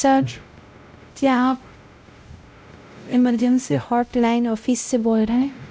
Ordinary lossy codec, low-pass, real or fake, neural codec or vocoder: none; none; fake; codec, 16 kHz, 0.5 kbps, X-Codec, WavLM features, trained on Multilingual LibriSpeech